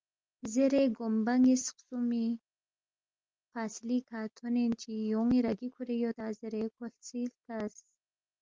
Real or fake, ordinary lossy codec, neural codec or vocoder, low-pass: real; Opus, 24 kbps; none; 7.2 kHz